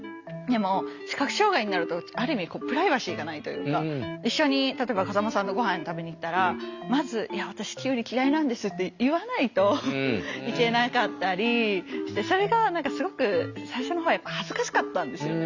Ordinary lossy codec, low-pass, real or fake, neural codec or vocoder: Opus, 64 kbps; 7.2 kHz; real; none